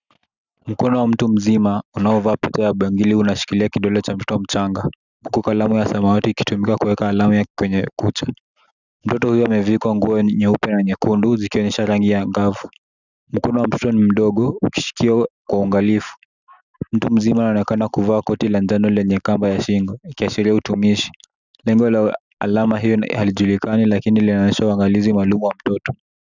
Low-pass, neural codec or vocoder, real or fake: 7.2 kHz; none; real